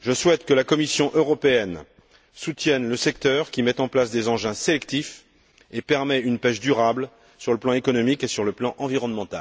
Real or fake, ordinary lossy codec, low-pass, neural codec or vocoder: real; none; none; none